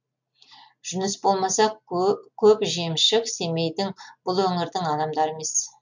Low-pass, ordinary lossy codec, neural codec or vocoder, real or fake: 7.2 kHz; MP3, 64 kbps; none; real